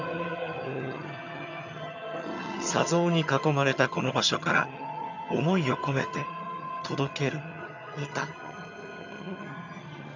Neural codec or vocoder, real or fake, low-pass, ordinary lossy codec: vocoder, 22.05 kHz, 80 mel bands, HiFi-GAN; fake; 7.2 kHz; none